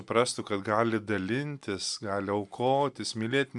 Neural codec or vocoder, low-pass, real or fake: none; 10.8 kHz; real